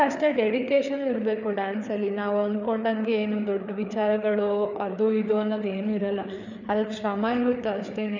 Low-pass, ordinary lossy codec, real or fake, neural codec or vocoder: 7.2 kHz; none; fake; codec, 16 kHz, 4 kbps, FreqCodec, larger model